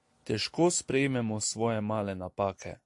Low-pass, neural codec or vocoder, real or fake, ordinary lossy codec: 10.8 kHz; none; real; AAC, 64 kbps